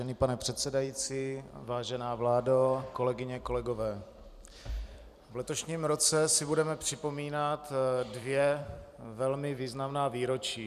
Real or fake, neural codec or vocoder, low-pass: fake; vocoder, 44.1 kHz, 128 mel bands every 256 samples, BigVGAN v2; 14.4 kHz